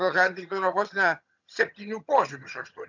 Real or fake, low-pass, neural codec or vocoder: fake; 7.2 kHz; vocoder, 22.05 kHz, 80 mel bands, HiFi-GAN